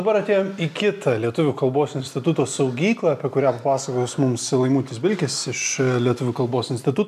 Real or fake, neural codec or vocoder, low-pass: real; none; 14.4 kHz